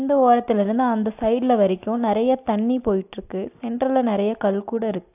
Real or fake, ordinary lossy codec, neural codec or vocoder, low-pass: real; AAC, 32 kbps; none; 3.6 kHz